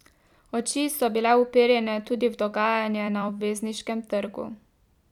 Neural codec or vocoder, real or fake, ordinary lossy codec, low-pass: vocoder, 44.1 kHz, 128 mel bands every 256 samples, BigVGAN v2; fake; none; 19.8 kHz